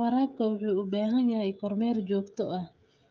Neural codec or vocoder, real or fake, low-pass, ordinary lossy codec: codec, 16 kHz, 16 kbps, FreqCodec, smaller model; fake; 7.2 kHz; Opus, 24 kbps